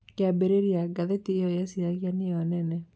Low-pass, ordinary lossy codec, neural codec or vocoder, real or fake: none; none; none; real